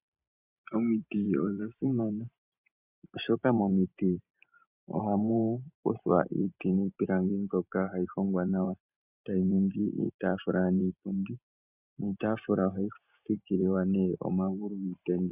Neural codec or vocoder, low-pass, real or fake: vocoder, 44.1 kHz, 128 mel bands every 512 samples, BigVGAN v2; 3.6 kHz; fake